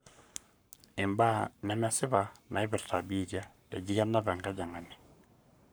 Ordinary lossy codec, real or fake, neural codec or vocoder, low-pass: none; fake; codec, 44.1 kHz, 7.8 kbps, Pupu-Codec; none